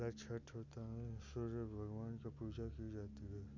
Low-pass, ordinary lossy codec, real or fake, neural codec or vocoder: 7.2 kHz; none; real; none